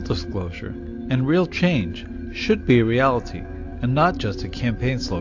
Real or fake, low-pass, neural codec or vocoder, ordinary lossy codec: real; 7.2 kHz; none; AAC, 48 kbps